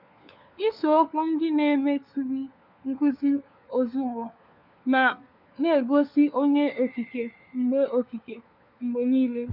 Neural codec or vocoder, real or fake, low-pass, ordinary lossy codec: codec, 16 kHz, 4 kbps, FunCodec, trained on LibriTTS, 50 frames a second; fake; 5.4 kHz; MP3, 48 kbps